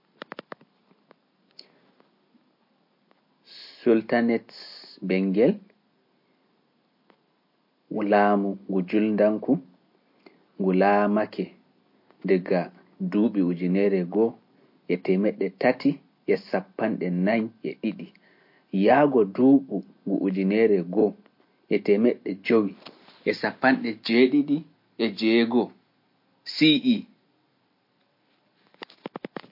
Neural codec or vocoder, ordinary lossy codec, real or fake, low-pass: none; MP3, 32 kbps; real; 5.4 kHz